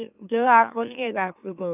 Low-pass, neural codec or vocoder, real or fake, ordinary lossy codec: 3.6 kHz; autoencoder, 44.1 kHz, a latent of 192 numbers a frame, MeloTTS; fake; none